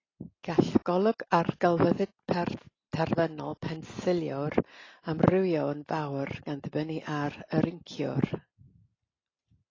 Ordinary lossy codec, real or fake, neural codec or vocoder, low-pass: AAC, 32 kbps; real; none; 7.2 kHz